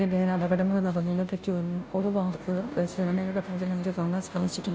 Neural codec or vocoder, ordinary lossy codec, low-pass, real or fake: codec, 16 kHz, 0.5 kbps, FunCodec, trained on Chinese and English, 25 frames a second; none; none; fake